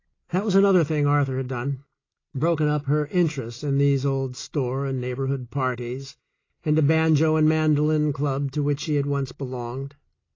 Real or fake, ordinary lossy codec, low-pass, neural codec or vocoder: real; AAC, 32 kbps; 7.2 kHz; none